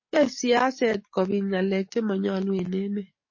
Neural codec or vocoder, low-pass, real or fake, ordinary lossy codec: codec, 44.1 kHz, 7.8 kbps, DAC; 7.2 kHz; fake; MP3, 32 kbps